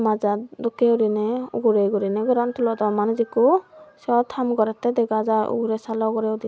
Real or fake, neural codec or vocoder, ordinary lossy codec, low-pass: real; none; none; none